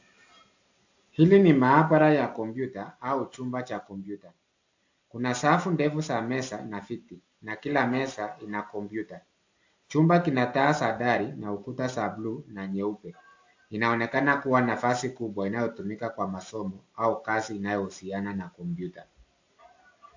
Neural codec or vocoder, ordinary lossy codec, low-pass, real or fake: none; AAC, 48 kbps; 7.2 kHz; real